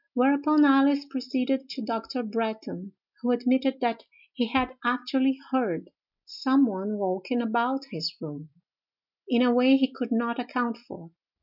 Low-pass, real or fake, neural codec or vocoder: 5.4 kHz; real; none